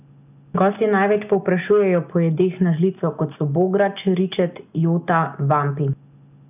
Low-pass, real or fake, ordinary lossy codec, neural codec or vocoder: 3.6 kHz; real; none; none